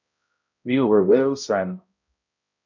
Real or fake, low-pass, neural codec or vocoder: fake; 7.2 kHz; codec, 16 kHz, 0.5 kbps, X-Codec, HuBERT features, trained on balanced general audio